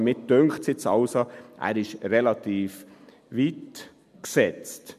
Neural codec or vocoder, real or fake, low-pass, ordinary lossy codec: none; real; 14.4 kHz; MP3, 96 kbps